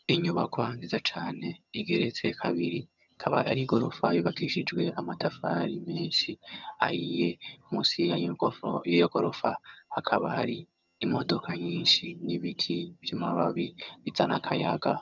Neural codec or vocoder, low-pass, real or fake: vocoder, 22.05 kHz, 80 mel bands, HiFi-GAN; 7.2 kHz; fake